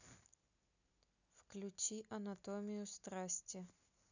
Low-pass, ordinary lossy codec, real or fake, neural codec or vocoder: 7.2 kHz; none; real; none